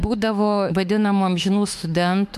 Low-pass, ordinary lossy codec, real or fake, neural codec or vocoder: 14.4 kHz; MP3, 96 kbps; fake; autoencoder, 48 kHz, 32 numbers a frame, DAC-VAE, trained on Japanese speech